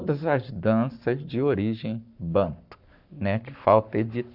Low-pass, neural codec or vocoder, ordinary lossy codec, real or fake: 5.4 kHz; codec, 44.1 kHz, 7.8 kbps, Pupu-Codec; none; fake